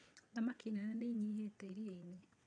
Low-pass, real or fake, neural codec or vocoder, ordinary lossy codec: 9.9 kHz; fake; vocoder, 22.05 kHz, 80 mel bands, WaveNeXt; none